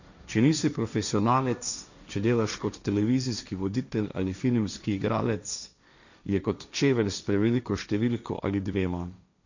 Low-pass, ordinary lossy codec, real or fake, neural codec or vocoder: 7.2 kHz; none; fake; codec, 16 kHz, 1.1 kbps, Voila-Tokenizer